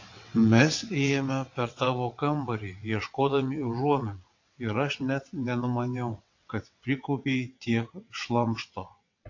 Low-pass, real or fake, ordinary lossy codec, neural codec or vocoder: 7.2 kHz; fake; AAC, 48 kbps; vocoder, 22.05 kHz, 80 mel bands, WaveNeXt